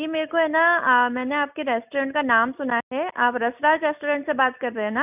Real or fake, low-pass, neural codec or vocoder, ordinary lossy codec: real; 3.6 kHz; none; none